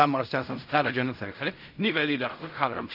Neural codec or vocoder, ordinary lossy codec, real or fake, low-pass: codec, 16 kHz in and 24 kHz out, 0.4 kbps, LongCat-Audio-Codec, fine tuned four codebook decoder; none; fake; 5.4 kHz